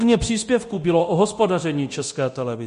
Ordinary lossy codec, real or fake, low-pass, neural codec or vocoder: MP3, 48 kbps; fake; 10.8 kHz; codec, 24 kHz, 0.9 kbps, DualCodec